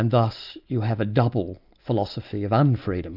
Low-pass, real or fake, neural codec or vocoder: 5.4 kHz; real; none